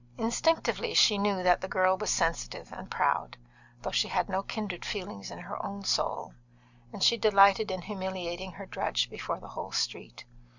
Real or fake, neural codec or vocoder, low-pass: real; none; 7.2 kHz